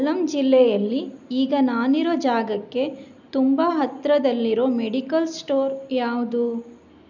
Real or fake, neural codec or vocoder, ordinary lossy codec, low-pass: real; none; none; 7.2 kHz